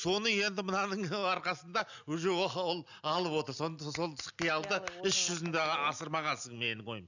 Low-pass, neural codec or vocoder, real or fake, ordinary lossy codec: 7.2 kHz; none; real; none